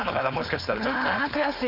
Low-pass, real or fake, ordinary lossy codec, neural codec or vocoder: 5.4 kHz; fake; none; codec, 16 kHz, 4.8 kbps, FACodec